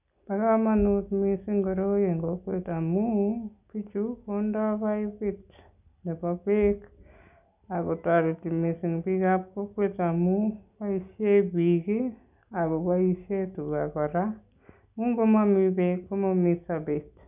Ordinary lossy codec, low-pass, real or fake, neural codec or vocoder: none; 3.6 kHz; real; none